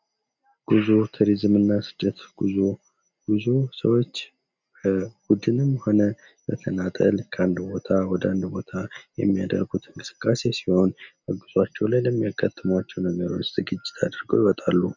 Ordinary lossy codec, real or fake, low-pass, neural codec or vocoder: MP3, 64 kbps; real; 7.2 kHz; none